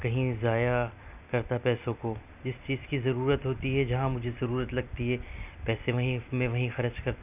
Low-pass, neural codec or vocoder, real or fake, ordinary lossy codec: 3.6 kHz; none; real; none